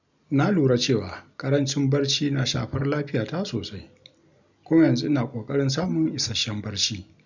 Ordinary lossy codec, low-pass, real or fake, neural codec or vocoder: none; 7.2 kHz; real; none